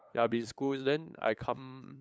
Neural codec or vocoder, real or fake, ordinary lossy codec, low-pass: codec, 16 kHz, 8 kbps, FunCodec, trained on LibriTTS, 25 frames a second; fake; none; none